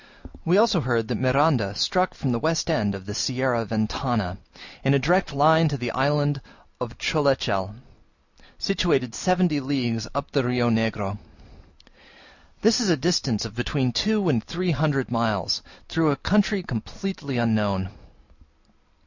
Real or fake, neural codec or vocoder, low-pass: real; none; 7.2 kHz